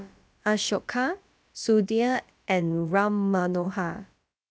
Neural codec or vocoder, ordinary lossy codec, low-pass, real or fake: codec, 16 kHz, about 1 kbps, DyCAST, with the encoder's durations; none; none; fake